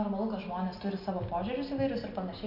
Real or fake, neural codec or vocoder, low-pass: real; none; 5.4 kHz